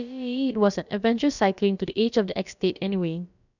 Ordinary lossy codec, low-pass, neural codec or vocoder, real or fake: none; 7.2 kHz; codec, 16 kHz, about 1 kbps, DyCAST, with the encoder's durations; fake